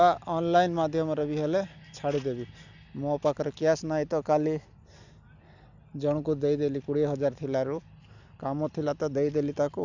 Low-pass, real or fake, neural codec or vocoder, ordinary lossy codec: 7.2 kHz; real; none; none